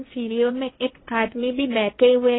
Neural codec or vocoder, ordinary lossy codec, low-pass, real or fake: codec, 16 kHz, 1.1 kbps, Voila-Tokenizer; AAC, 16 kbps; 7.2 kHz; fake